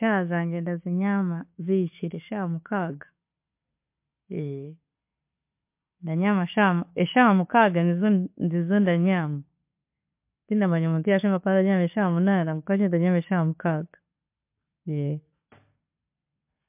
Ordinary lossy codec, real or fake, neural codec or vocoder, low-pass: MP3, 32 kbps; real; none; 3.6 kHz